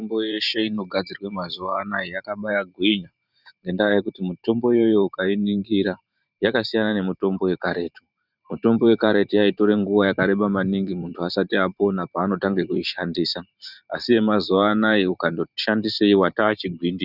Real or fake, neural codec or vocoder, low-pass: fake; vocoder, 44.1 kHz, 128 mel bands every 512 samples, BigVGAN v2; 5.4 kHz